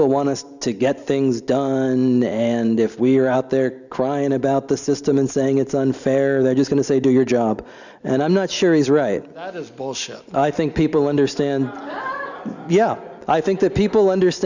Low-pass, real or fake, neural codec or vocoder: 7.2 kHz; real; none